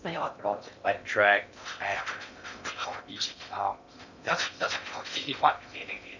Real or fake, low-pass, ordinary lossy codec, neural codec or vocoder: fake; 7.2 kHz; none; codec, 16 kHz in and 24 kHz out, 0.6 kbps, FocalCodec, streaming, 2048 codes